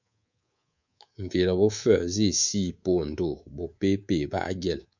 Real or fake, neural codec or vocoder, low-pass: fake; codec, 24 kHz, 3.1 kbps, DualCodec; 7.2 kHz